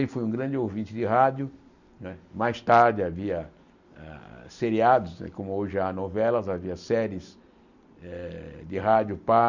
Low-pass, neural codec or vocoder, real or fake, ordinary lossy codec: 7.2 kHz; none; real; none